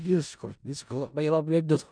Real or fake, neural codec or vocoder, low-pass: fake; codec, 16 kHz in and 24 kHz out, 0.4 kbps, LongCat-Audio-Codec, four codebook decoder; 9.9 kHz